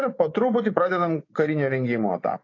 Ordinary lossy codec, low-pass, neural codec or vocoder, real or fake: AAC, 32 kbps; 7.2 kHz; vocoder, 24 kHz, 100 mel bands, Vocos; fake